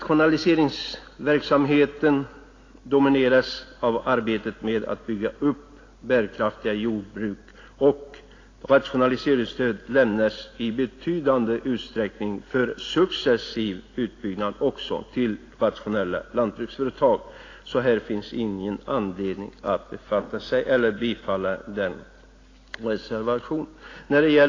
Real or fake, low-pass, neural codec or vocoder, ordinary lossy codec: real; 7.2 kHz; none; AAC, 32 kbps